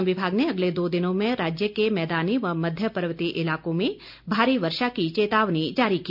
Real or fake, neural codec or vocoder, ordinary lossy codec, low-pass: real; none; none; 5.4 kHz